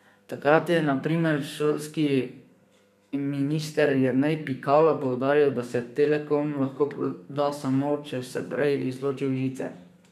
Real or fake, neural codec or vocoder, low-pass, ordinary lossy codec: fake; codec, 32 kHz, 1.9 kbps, SNAC; 14.4 kHz; none